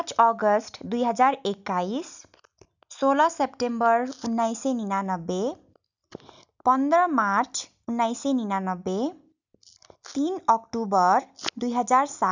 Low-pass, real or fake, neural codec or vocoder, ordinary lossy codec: 7.2 kHz; real; none; none